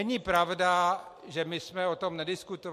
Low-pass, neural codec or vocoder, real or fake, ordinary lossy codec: 14.4 kHz; none; real; MP3, 64 kbps